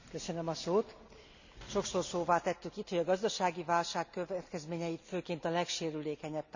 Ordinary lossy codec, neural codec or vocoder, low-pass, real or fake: none; none; 7.2 kHz; real